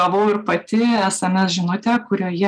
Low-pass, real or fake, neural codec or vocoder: 9.9 kHz; real; none